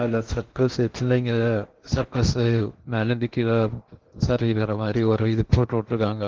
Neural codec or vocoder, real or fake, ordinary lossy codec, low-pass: codec, 16 kHz in and 24 kHz out, 0.6 kbps, FocalCodec, streaming, 2048 codes; fake; Opus, 16 kbps; 7.2 kHz